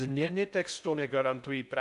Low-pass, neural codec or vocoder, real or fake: 10.8 kHz; codec, 16 kHz in and 24 kHz out, 0.6 kbps, FocalCodec, streaming, 2048 codes; fake